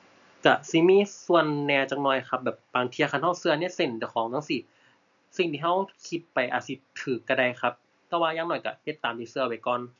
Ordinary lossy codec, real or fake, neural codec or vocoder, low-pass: none; real; none; 7.2 kHz